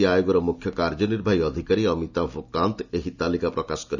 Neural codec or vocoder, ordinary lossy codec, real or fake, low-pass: none; none; real; 7.2 kHz